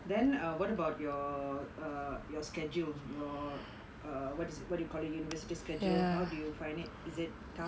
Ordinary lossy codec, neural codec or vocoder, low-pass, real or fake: none; none; none; real